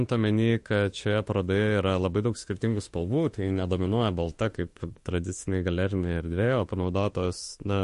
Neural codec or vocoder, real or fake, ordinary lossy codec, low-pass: autoencoder, 48 kHz, 32 numbers a frame, DAC-VAE, trained on Japanese speech; fake; MP3, 48 kbps; 14.4 kHz